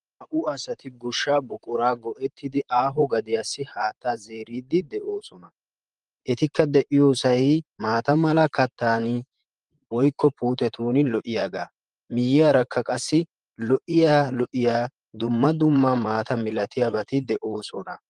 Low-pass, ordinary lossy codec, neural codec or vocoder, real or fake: 10.8 kHz; Opus, 24 kbps; vocoder, 44.1 kHz, 128 mel bands, Pupu-Vocoder; fake